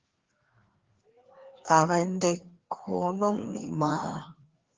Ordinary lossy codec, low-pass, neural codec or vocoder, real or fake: Opus, 16 kbps; 7.2 kHz; codec, 16 kHz, 2 kbps, FreqCodec, larger model; fake